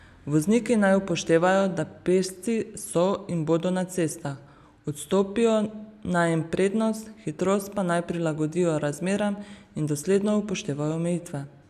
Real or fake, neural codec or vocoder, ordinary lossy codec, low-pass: real; none; none; 14.4 kHz